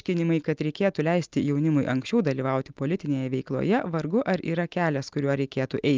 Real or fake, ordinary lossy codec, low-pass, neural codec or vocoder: real; Opus, 32 kbps; 7.2 kHz; none